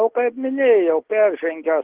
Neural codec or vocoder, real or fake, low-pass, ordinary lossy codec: none; real; 3.6 kHz; Opus, 16 kbps